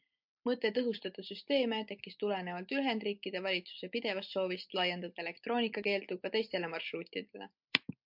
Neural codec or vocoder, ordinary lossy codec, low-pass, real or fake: none; MP3, 48 kbps; 5.4 kHz; real